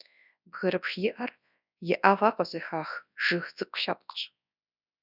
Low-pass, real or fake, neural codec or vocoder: 5.4 kHz; fake; codec, 24 kHz, 0.9 kbps, WavTokenizer, large speech release